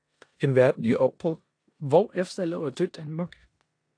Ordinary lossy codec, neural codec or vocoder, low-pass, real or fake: AAC, 48 kbps; codec, 16 kHz in and 24 kHz out, 0.9 kbps, LongCat-Audio-Codec, four codebook decoder; 9.9 kHz; fake